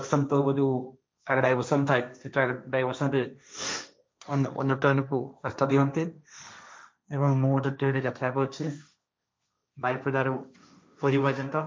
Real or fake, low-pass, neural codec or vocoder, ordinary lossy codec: fake; none; codec, 16 kHz, 1.1 kbps, Voila-Tokenizer; none